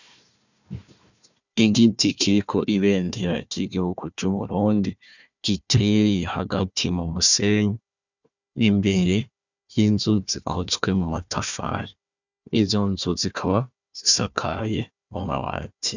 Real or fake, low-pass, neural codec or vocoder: fake; 7.2 kHz; codec, 16 kHz, 1 kbps, FunCodec, trained on Chinese and English, 50 frames a second